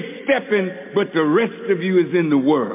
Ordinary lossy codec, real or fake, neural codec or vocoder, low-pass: MP3, 24 kbps; real; none; 3.6 kHz